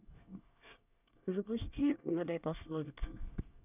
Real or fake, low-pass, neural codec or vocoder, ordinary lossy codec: fake; 3.6 kHz; codec, 24 kHz, 1 kbps, SNAC; none